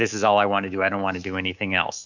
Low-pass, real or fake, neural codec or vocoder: 7.2 kHz; fake; codec, 24 kHz, 3.1 kbps, DualCodec